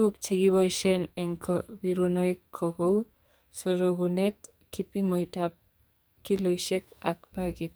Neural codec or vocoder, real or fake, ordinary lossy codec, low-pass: codec, 44.1 kHz, 2.6 kbps, SNAC; fake; none; none